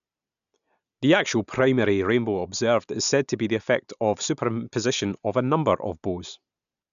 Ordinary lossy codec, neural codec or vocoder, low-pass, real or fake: MP3, 96 kbps; none; 7.2 kHz; real